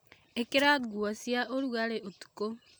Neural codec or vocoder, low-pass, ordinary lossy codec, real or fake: none; none; none; real